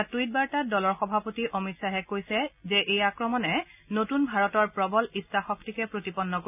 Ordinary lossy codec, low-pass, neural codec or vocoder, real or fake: none; 3.6 kHz; none; real